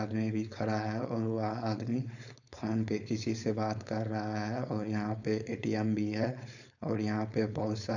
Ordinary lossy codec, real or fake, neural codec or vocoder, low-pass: none; fake; codec, 16 kHz, 4.8 kbps, FACodec; 7.2 kHz